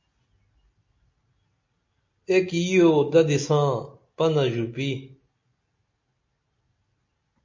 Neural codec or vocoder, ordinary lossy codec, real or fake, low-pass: none; MP3, 48 kbps; real; 7.2 kHz